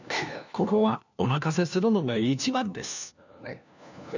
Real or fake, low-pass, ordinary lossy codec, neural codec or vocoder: fake; 7.2 kHz; none; codec, 16 kHz, 1 kbps, FunCodec, trained on LibriTTS, 50 frames a second